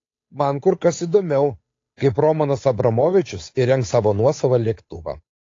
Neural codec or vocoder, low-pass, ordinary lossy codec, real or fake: codec, 16 kHz, 8 kbps, FunCodec, trained on Chinese and English, 25 frames a second; 7.2 kHz; AAC, 32 kbps; fake